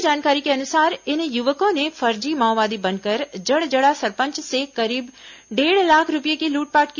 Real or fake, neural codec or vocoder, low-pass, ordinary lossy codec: real; none; 7.2 kHz; none